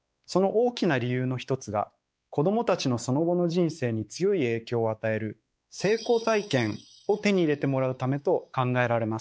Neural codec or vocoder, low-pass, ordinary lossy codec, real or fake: codec, 16 kHz, 4 kbps, X-Codec, WavLM features, trained on Multilingual LibriSpeech; none; none; fake